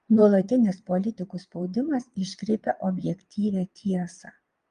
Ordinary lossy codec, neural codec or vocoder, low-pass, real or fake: Opus, 32 kbps; vocoder, 22.05 kHz, 80 mel bands, Vocos; 9.9 kHz; fake